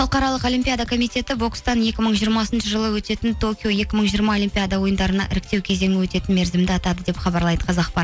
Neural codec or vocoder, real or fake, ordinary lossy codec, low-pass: none; real; none; none